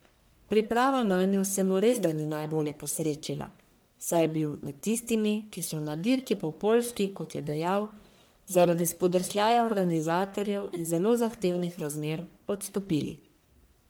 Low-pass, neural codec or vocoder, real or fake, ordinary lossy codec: none; codec, 44.1 kHz, 1.7 kbps, Pupu-Codec; fake; none